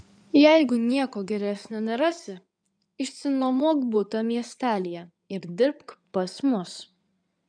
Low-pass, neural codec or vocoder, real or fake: 9.9 kHz; codec, 16 kHz in and 24 kHz out, 2.2 kbps, FireRedTTS-2 codec; fake